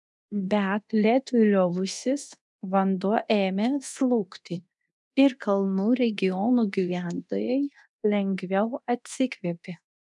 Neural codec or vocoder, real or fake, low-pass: codec, 24 kHz, 0.9 kbps, DualCodec; fake; 10.8 kHz